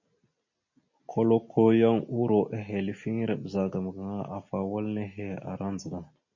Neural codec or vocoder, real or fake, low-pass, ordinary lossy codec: none; real; 7.2 kHz; MP3, 32 kbps